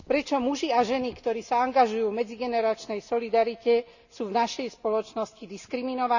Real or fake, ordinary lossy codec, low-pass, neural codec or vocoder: real; none; 7.2 kHz; none